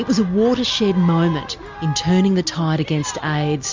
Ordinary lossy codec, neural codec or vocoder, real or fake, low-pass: MP3, 64 kbps; none; real; 7.2 kHz